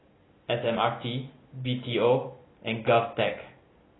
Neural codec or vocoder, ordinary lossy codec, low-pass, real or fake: none; AAC, 16 kbps; 7.2 kHz; real